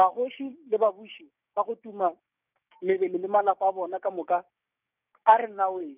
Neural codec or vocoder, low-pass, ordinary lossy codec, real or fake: codec, 16 kHz, 6 kbps, DAC; 3.6 kHz; none; fake